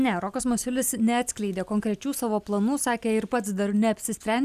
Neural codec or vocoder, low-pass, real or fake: none; 14.4 kHz; real